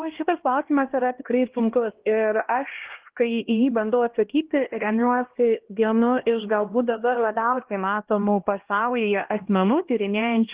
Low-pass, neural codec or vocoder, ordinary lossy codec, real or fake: 3.6 kHz; codec, 16 kHz, 1 kbps, X-Codec, HuBERT features, trained on LibriSpeech; Opus, 16 kbps; fake